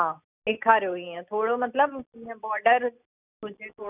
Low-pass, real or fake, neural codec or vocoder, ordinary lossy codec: 3.6 kHz; real; none; none